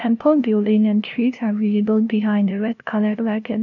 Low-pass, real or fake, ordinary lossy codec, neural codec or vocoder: 7.2 kHz; fake; none; codec, 16 kHz, 0.5 kbps, FunCodec, trained on LibriTTS, 25 frames a second